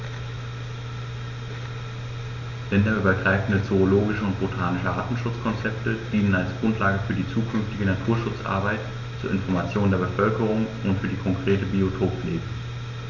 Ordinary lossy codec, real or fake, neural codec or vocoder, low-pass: none; real; none; 7.2 kHz